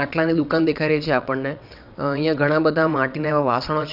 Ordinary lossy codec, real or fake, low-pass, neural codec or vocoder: none; fake; 5.4 kHz; vocoder, 22.05 kHz, 80 mel bands, WaveNeXt